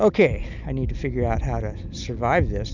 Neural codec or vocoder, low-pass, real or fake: none; 7.2 kHz; real